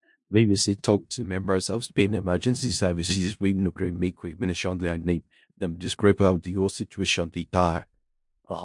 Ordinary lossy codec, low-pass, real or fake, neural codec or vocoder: MP3, 64 kbps; 10.8 kHz; fake; codec, 16 kHz in and 24 kHz out, 0.4 kbps, LongCat-Audio-Codec, four codebook decoder